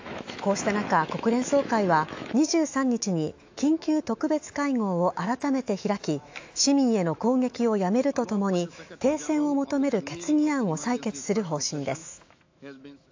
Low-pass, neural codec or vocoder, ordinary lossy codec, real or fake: 7.2 kHz; autoencoder, 48 kHz, 128 numbers a frame, DAC-VAE, trained on Japanese speech; AAC, 48 kbps; fake